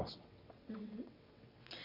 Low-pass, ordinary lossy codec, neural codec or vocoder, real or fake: 5.4 kHz; none; vocoder, 22.05 kHz, 80 mel bands, WaveNeXt; fake